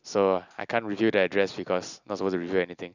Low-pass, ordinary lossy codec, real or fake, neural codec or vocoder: 7.2 kHz; none; real; none